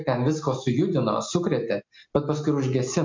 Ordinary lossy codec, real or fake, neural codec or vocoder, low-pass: MP3, 48 kbps; real; none; 7.2 kHz